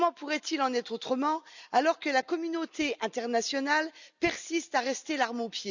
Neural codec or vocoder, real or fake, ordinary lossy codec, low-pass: none; real; none; 7.2 kHz